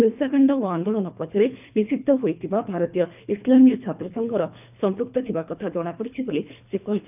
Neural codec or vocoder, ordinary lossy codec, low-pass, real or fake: codec, 24 kHz, 3 kbps, HILCodec; none; 3.6 kHz; fake